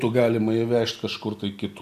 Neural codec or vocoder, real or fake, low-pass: none; real; 14.4 kHz